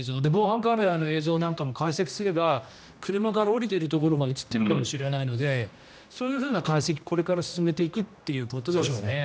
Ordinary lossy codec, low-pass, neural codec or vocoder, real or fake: none; none; codec, 16 kHz, 1 kbps, X-Codec, HuBERT features, trained on general audio; fake